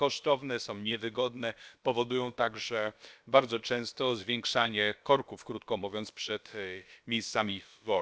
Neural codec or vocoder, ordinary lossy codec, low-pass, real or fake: codec, 16 kHz, about 1 kbps, DyCAST, with the encoder's durations; none; none; fake